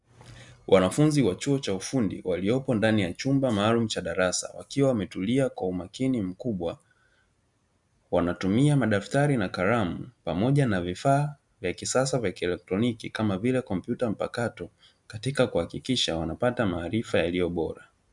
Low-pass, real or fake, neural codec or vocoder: 10.8 kHz; real; none